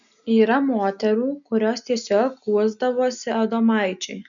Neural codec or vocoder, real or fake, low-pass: none; real; 7.2 kHz